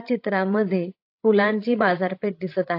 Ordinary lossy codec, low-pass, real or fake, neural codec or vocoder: AAC, 32 kbps; 5.4 kHz; fake; codec, 16 kHz, 16 kbps, FreqCodec, larger model